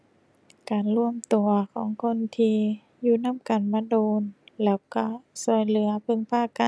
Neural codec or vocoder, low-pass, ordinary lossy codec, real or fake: none; none; none; real